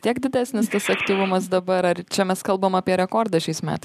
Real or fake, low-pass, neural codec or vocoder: real; 14.4 kHz; none